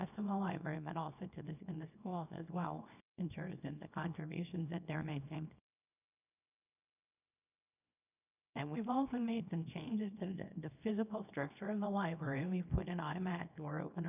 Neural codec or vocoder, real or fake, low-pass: codec, 24 kHz, 0.9 kbps, WavTokenizer, small release; fake; 3.6 kHz